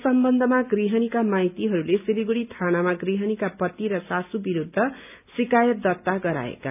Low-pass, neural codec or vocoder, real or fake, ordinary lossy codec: 3.6 kHz; none; real; none